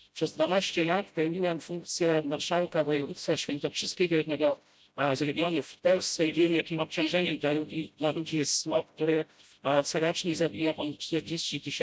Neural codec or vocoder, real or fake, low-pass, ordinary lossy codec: codec, 16 kHz, 0.5 kbps, FreqCodec, smaller model; fake; none; none